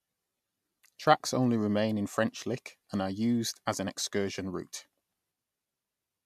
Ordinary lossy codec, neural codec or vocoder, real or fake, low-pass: MP3, 96 kbps; none; real; 14.4 kHz